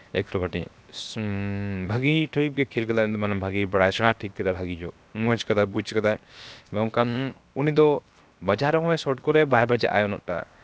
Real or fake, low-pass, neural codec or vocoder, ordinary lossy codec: fake; none; codec, 16 kHz, 0.7 kbps, FocalCodec; none